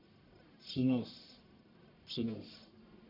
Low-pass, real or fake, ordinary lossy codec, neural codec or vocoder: 5.4 kHz; fake; none; codec, 44.1 kHz, 1.7 kbps, Pupu-Codec